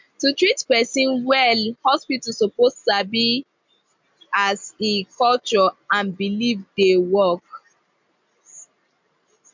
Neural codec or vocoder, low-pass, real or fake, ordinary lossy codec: none; 7.2 kHz; real; MP3, 64 kbps